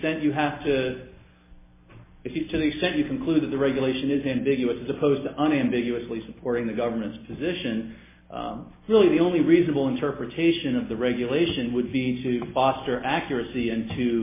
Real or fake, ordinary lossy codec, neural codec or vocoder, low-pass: real; MP3, 16 kbps; none; 3.6 kHz